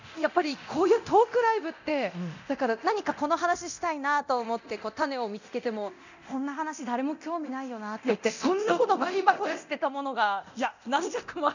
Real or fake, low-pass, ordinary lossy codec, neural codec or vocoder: fake; 7.2 kHz; none; codec, 24 kHz, 0.9 kbps, DualCodec